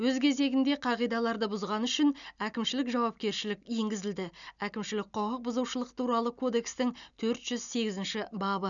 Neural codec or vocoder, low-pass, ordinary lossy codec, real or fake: none; 7.2 kHz; none; real